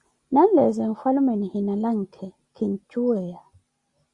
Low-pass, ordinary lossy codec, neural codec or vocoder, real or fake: 10.8 kHz; Opus, 64 kbps; none; real